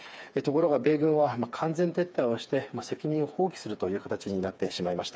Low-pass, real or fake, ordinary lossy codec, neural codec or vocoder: none; fake; none; codec, 16 kHz, 4 kbps, FreqCodec, smaller model